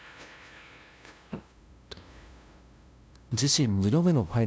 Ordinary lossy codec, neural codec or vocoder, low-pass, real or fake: none; codec, 16 kHz, 0.5 kbps, FunCodec, trained on LibriTTS, 25 frames a second; none; fake